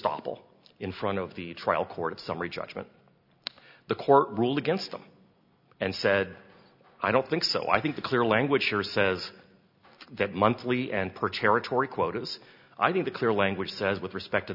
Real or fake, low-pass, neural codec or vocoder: real; 5.4 kHz; none